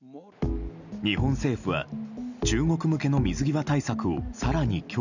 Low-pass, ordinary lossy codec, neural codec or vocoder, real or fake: 7.2 kHz; none; none; real